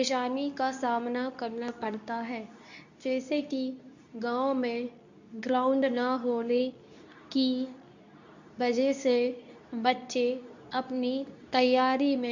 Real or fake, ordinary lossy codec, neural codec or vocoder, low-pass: fake; none; codec, 24 kHz, 0.9 kbps, WavTokenizer, medium speech release version 2; 7.2 kHz